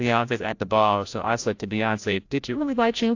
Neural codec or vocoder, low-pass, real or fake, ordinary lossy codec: codec, 16 kHz, 0.5 kbps, FreqCodec, larger model; 7.2 kHz; fake; AAC, 48 kbps